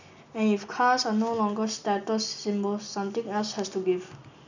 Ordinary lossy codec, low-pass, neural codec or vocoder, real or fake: none; 7.2 kHz; none; real